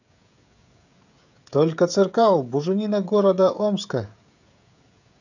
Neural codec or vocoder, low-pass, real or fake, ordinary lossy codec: codec, 16 kHz, 16 kbps, FreqCodec, smaller model; 7.2 kHz; fake; none